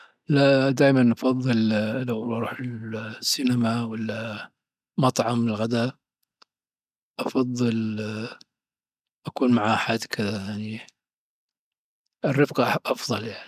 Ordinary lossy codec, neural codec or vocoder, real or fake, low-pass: none; none; real; 14.4 kHz